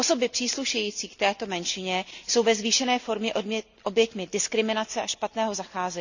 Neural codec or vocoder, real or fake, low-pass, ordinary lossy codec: none; real; 7.2 kHz; none